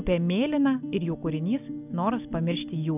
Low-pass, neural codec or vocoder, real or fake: 3.6 kHz; none; real